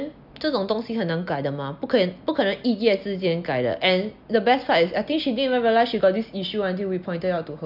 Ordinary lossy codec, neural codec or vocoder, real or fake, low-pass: none; none; real; 5.4 kHz